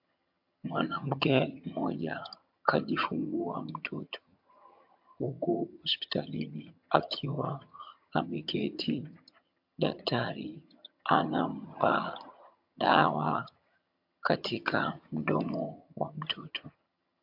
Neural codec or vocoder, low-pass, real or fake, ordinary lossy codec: vocoder, 22.05 kHz, 80 mel bands, HiFi-GAN; 5.4 kHz; fake; MP3, 48 kbps